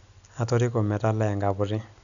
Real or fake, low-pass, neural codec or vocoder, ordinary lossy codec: real; 7.2 kHz; none; none